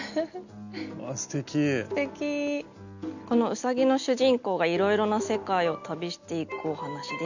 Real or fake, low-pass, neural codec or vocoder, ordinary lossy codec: real; 7.2 kHz; none; none